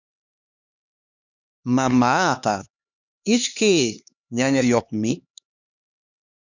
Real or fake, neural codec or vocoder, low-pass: fake; codec, 16 kHz, 2 kbps, X-Codec, HuBERT features, trained on LibriSpeech; 7.2 kHz